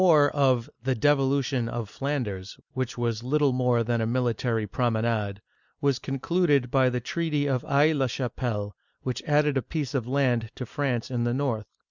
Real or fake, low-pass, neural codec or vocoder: real; 7.2 kHz; none